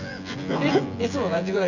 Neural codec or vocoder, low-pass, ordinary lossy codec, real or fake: vocoder, 24 kHz, 100 mel bands, Vocos; 7.2 kHz; none; fake